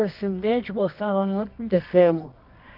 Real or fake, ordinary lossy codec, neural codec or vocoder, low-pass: fake; none; codec, 24 kHz, 0.9 kbps, WavTokenizer, medium music audio release; 5.4 kHz